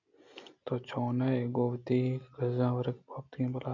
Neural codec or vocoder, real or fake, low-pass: none; real; 7.2 kHz